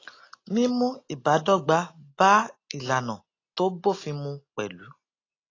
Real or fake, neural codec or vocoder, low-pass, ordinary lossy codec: real; none; 7.2 kHz; AAC, 32 kbps